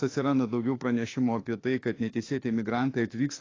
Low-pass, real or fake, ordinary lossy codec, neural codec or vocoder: 7.2 kHz; fake; AAC, 32 kbps; autoencoder, 48 kHz, 32 numbers a frame, DAC-VAE, trained on Japanese speech